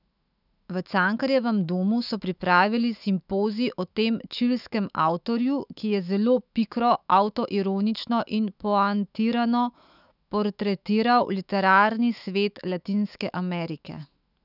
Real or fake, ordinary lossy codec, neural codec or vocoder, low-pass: fake; none; autoencoder, 48 kHz, 128 numbers a frame, DAC-VAE, trained on Japanese speech; 5.4 kHz